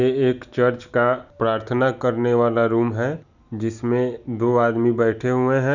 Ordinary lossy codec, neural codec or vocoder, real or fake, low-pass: none; none; real; 7.2 kHz